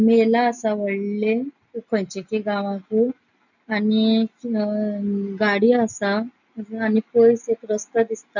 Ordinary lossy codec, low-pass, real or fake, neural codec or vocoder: none; 7.2 kHz; real; none